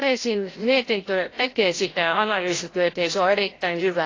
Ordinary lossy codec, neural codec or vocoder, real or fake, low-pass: AAC, 32 kbps; codec, 16 kHz, 0.5 kbps, FreqCodec, larger model; fake; 7.2 kHz